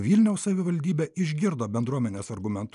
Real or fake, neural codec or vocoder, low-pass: real; none; 10.8 kHz